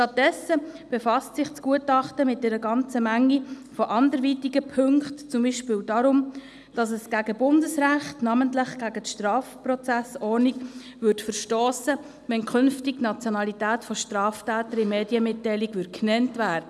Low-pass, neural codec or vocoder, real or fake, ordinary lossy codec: none; none; real; none